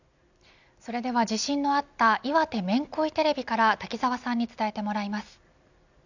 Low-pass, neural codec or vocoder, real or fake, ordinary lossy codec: 7.2 kHz; none; real; none